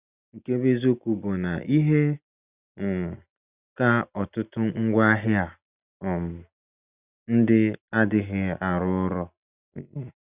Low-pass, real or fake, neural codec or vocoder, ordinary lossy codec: 3.6 kHz; real; none; Opus, 64 kbps